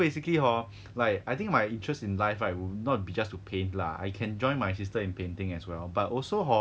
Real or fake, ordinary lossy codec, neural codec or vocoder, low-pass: real; none; none; none